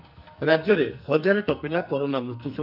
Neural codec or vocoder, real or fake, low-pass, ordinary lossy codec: codec, 44.1 kHz, 2.6 kbps, SNAC; fake; 5.4 kHz; none